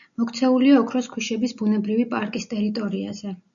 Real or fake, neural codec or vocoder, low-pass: real; none; 7.2 kHz